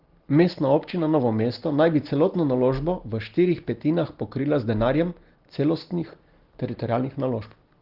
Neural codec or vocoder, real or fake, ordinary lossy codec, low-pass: none; real; Opus, 16 kbps; 5.4 kHz